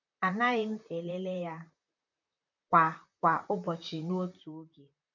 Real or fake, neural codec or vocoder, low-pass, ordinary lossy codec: fake; vocoder, 44.1 kHz, 128 mel bands, Pupu-Vocoder; 7.2 kHz; none